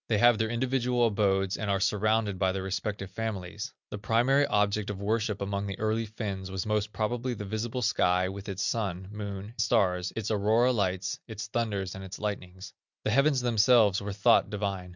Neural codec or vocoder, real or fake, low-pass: none; real; 7.2 kHz